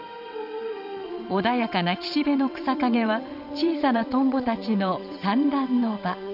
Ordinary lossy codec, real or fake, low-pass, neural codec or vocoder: none; fake; 5.4 kHz; vocoder, 22.05 kHz, 80 mel bands, WaveNeXt